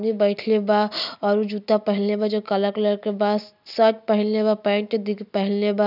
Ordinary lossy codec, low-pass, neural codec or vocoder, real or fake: none; 5.4 kHz; none; real